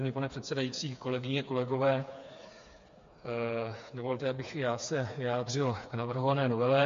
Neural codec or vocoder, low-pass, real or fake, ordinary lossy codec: codec, 16 kHz, 4 kbps, FreqCodec, smaller model; 7.2 kHz; fake; MP3, 48 kbps